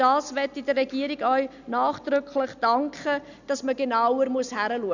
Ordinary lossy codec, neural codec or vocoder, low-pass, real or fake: none; none; 7.2 kHz; real